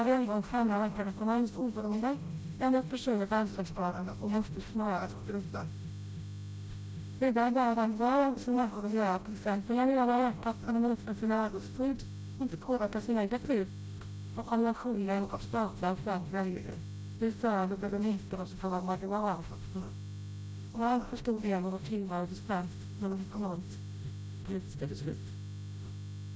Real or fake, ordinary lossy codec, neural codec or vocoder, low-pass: fake; none; codec, 16 kHz, 0.5 kbps, FreqCodec, smaller model; none